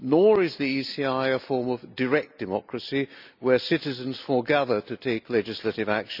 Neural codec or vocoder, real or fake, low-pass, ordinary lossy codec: none; real; 5.4 kHz; none